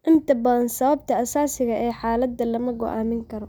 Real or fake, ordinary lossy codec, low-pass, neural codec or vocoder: real; none; none; none